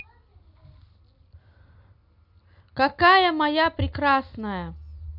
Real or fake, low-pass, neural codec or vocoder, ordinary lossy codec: real; 5.4 kHz; none; none